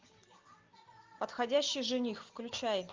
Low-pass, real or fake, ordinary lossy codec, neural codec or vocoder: 7.2 kHz; real; Opus, 32 kbps; none